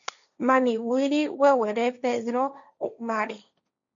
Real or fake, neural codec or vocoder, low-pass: fake; codec, 16 kHz, 1.1 kbps, Voila-Tokenizer; 7.2 kHz